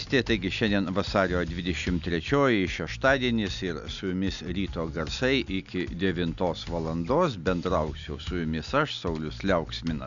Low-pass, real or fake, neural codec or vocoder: 7.2 kHz; real; none